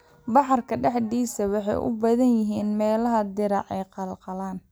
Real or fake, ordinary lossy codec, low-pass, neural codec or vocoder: real; none; none; none